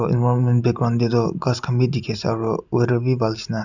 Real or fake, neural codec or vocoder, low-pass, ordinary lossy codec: real; none; 7.2 kHz; none